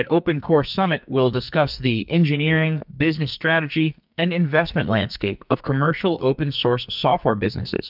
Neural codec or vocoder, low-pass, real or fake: codec, 44.1 kHz, 2.6 kbps, SNAC; 5.4 kHz; fake